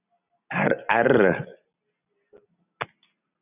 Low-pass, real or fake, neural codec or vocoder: 3.6 kHz; real; none